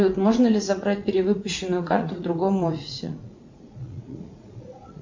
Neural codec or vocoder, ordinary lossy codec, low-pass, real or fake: vocoder, 44.1 kHz, 80 mel bands, Vocos; MP3, 48 kbps; 7.2 kHz; fake